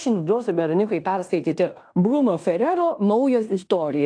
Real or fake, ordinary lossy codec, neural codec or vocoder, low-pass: fake; AAC, 64 kbps; codec, 16 kHz in and 24 kHz out, 0.9 kbps, LongCat-Audio-Codec, fine tuned four codebook decoder; 9.9 kHz